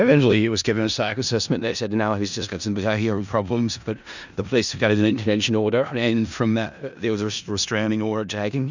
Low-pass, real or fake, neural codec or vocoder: 7.2 kHz; fake; codec, 16 kHz in and 24 kHz out, 0.4 kbps, LongCat-Audio-Codec, four codebook decoder